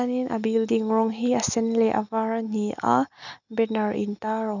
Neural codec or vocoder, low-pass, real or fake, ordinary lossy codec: none; 7.2 kHz; real; none